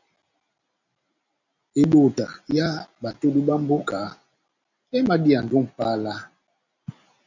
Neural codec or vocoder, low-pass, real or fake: none; 7.2 kHz; real